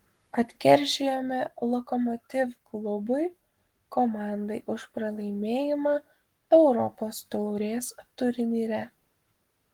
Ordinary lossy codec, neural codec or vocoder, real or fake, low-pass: Opus, 24 kbps; codec, 44.1 kHz, 7.8 kbps, Pupu-Codec; fake; 19.8 kHz